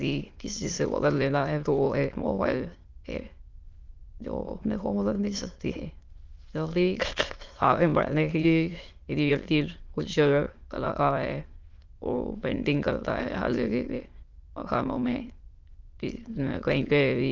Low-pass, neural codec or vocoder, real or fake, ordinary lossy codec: 7.2 kHz; autoencoder, 22.05 kHz, a latent of 192 numbers a frame, VITS, trained on many speakers; fake; Opus, 32 kbps